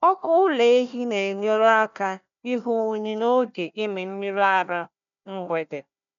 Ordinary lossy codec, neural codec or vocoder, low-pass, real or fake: none; codec, 16 kHz, 1 kbps, FunCodec, trained on Chinese and English, 50 frames a second; 7.2 kHz; fake